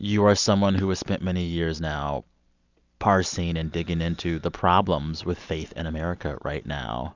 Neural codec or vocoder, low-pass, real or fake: none; 7.2 kHz; real